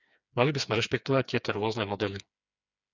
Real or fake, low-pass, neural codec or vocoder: fake; 7.2 kHz; codec, 16 kHz, 4 kbps, FreqCodec, smaller model